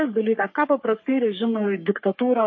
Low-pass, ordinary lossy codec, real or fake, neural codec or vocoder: 7.2 kHz; MP3, 32 kbps; fake; codec, 44.1 kHz, 3.4 kbps, Pupu-Codec